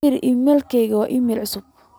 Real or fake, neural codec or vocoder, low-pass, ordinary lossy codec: real; none; none; none